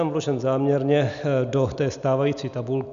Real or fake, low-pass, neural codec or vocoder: real; 7.2 kHz; none